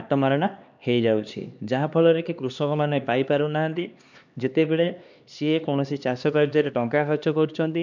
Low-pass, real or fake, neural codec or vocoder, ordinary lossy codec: 7.2 kHz; fake; codec, 16 kHz, 2 kbps, X-Codec, HuBERT features, trained on LibriSpeech; none